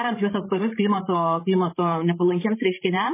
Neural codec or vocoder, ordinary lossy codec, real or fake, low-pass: codec, 16 kHz, 8 kbps, FreqCodec, larger model; MP3, 16 kbps; fake; 3.6 kHz